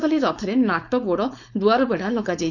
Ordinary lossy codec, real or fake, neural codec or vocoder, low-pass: none; fake; codec, 16 kHz, 4.8 kbps, FACodec; 7.2 kHz